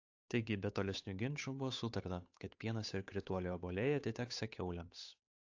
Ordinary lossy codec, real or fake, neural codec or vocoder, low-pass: AAC, 48 kbps; fake; codec, 16 kHz, 8 kbps, FunCodec, trained on Chinese and English, 25 frames a second; 7.2 kHz